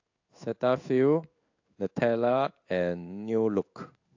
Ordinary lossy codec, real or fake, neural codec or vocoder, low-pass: AAC, 48 kbps; fake; codec, 16 kHz in and 24 kHz out, 1 kbps, XY-Tokenizer; 7.2 kHz